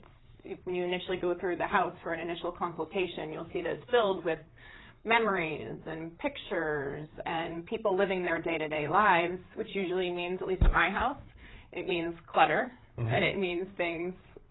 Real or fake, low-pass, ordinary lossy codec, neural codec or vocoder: fake; 7.2 kHz; AAC, 16 kbps; codec, 16 kHz, 4 kbps, FreqCodec, larger model